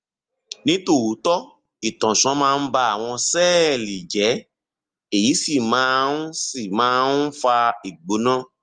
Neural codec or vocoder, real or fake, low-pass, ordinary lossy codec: none; real; 9.9 kHz; Opus, 24 kbps